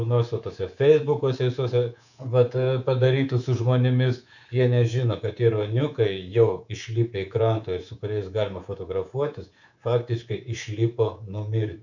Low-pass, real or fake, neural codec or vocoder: 7.2 kHz; fake; codec, 24 kHz, 3.1 kbps, DualCodec